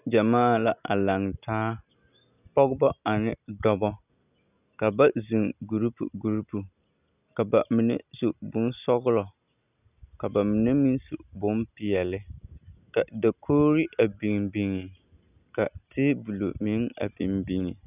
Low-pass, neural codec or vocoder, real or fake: 3.6 kHz; none; real